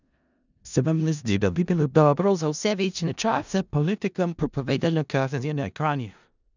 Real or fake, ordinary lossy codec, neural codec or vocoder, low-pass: fake; none; codec, 16 kHz in and 24 kHz out, 0.4 kbps, LongCat-Audio-Codec, four codebook decoder; 7.2 kHz